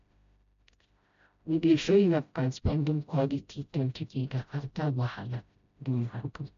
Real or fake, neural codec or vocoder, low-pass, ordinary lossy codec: fake; codec, 16 kHz, 0.5 kbps, FreqCodec, smaller model; 7.2 kHz; MP3, 64 kbps